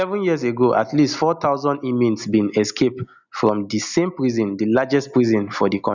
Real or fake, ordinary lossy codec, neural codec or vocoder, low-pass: real; none; none; 7.2 kHz